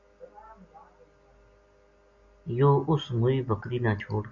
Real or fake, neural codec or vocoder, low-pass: real; none; 7.2 kHz